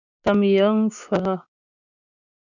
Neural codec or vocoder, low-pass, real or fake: codec, 16 kHz, 6 kbps, DAC; 7.2 kHz; fake